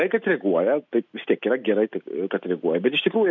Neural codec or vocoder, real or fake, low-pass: none; real; 7.2 kHz